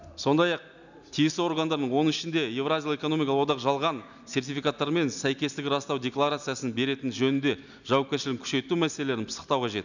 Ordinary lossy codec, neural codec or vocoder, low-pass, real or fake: none; none; 7.2 kHz; real